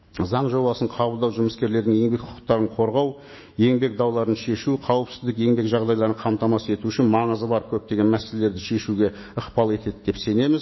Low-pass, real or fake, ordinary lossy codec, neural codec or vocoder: 7.2 kHz; fake; MP3, 24 kbps; autoencoder, 48 kHz, 128 numbers a frame, DAC-VAE, trained on Japanese speech